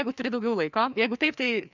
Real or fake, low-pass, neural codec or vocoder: fake; 7.2 kHz; codec, 16 kHz, 2 kbps, FreqCodec, larger model